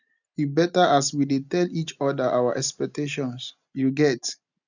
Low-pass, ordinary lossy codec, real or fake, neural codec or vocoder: 7.2 kHz; none; real; none